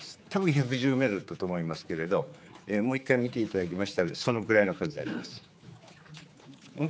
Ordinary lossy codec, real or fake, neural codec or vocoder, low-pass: none; fake; codec, 16 kHz, 4 kbps, X-Codec, HuBERT features, trained on general audio; none